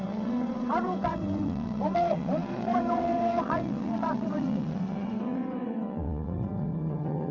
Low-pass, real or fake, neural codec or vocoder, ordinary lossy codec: 7.2 kHz; fake; vocoder, 22.05 kHz, 80 mel bands, WaveNeXt; none